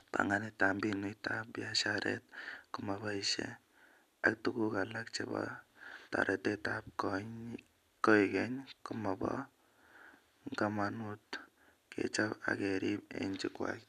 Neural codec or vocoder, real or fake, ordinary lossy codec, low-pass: none; real; none; 14.4 kHz